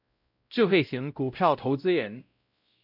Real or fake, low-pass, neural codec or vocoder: fake; 5.4 kHz; codec, 16 kHz, 0.5 kbps, X-Codec, WavLM features, trained on Multilingual LibriSpeech